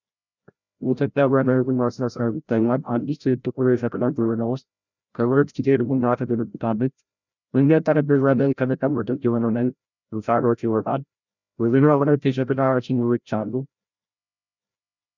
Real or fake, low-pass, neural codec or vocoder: fake; 7.2 kHz; codec, 16 kHz, 0.5 kbps, FreqCodec, larger model